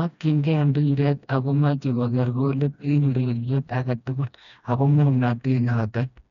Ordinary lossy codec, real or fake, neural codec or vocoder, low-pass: none; fake; codec, 16 kHz, 1 kbps, FreqCodec, smaller model; 7.2 kHz